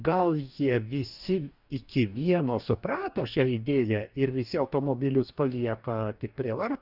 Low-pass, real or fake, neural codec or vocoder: 5.4 kHz; fake; codec, 44.1 kHz, 2.6 kbps, DAC